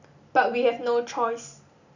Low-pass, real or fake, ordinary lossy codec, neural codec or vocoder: 7.2 kHz; real; none; none